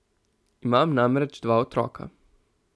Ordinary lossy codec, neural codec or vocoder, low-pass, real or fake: none; none; none; real